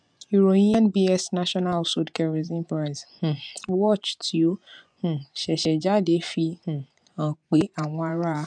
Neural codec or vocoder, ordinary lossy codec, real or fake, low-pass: vocoder, 24 kHz, 100 mel bands, Vocos; none; fake; 9.9 kHz